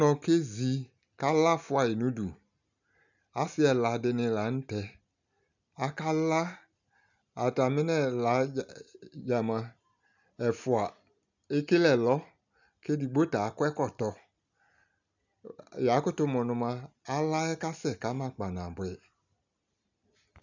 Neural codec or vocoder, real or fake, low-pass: none; real; 7.2 kHz